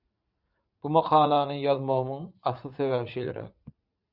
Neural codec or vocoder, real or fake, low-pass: vocoder, 44.1 kHz, 80 mel bands, Vocos; fake; 5.4 kHz